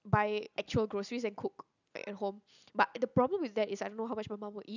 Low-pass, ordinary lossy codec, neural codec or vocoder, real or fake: 7.2 kHz; none; none; real